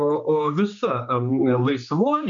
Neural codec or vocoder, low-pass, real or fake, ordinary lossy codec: codec, 16 kHz, 2 kbps, X-Codec, HuBERT features, trained on general audio; 7.2 kHz; fake; MP3, 64 kbps